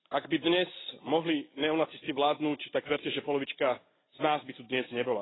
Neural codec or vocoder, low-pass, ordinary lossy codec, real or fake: none; 7.2 kHz; AAC, 16 kbps; real